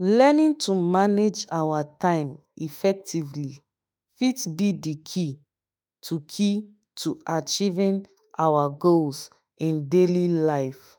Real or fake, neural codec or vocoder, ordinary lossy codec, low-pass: fake; autoencoder, 48 kHz, 32 numbers a frame, DAC-VAE, trained on Japanese speech; none; none